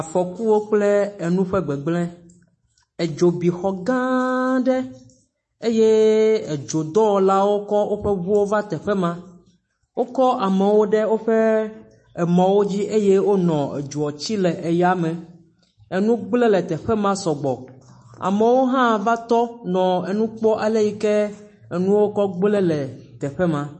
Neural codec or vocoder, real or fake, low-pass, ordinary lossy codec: autoencoder, 48 kHz, 128 numbers a frame, DAC-VAE, trained on Japanese speech; fake; 10.8 kHz; MP3, 32 kbps